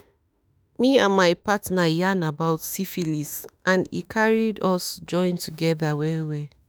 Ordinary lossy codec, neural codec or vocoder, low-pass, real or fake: none; autoencoder, 48 kHz, 32 numbers a frame, DAC-VAE, trained on Japanese speech; none; fake